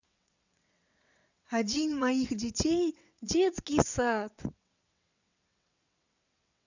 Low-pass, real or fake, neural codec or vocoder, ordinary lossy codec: 7.2 kHz; fake; vocoder, 22.05 kHz, 80 mel bands, WaveNeXt; none